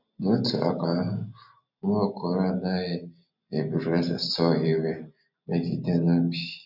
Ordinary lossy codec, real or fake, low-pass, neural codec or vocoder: none; real; 5.4 kHz; none